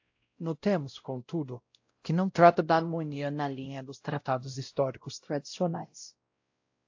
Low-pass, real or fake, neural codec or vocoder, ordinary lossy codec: 7.2 kHz; fake; codec, 16 kHz, 0.5 kbps, X-Codec, WavLM features, trained on Multilingual LibriSpeech; AAC, 48 kbps